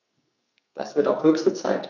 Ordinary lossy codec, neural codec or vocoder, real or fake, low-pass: none; codec, 44.1 kHz, 2.6 kbps, SNAC; fake; 7.2 kHz